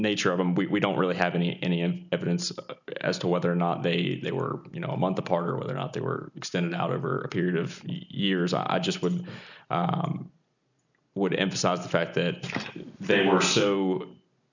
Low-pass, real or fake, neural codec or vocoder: 7.2 kHz; real; none